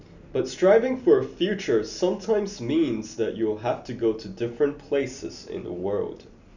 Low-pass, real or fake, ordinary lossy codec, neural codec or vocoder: 7.2 kHz; real; none; none